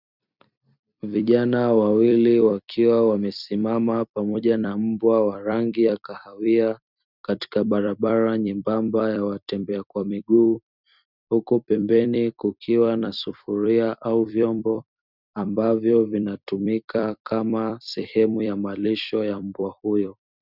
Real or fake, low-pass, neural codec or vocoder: fake; 5.4 kHz; vocoder, 44.1 kHz, 128 mel bands every 256 samples, BigVGAN v2